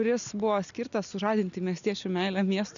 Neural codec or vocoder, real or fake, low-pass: none; real; 7.2 kHz